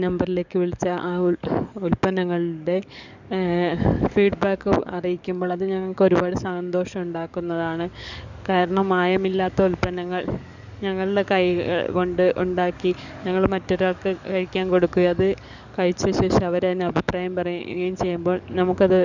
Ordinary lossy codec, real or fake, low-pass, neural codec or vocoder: none; fake; 7.2 kHz; codec, 44.1 kHz, 7.8 kbps, DAC